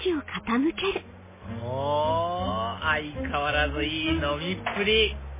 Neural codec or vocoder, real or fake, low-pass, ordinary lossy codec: none; real; 3.6 kHz; MP3, 16 kbps